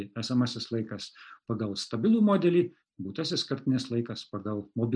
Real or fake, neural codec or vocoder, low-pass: real; none; 9.9 kHz